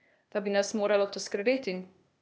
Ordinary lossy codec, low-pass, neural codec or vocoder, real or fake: none; none; codec, 16 kHz, 0.8 kbps, ZipCodec; fake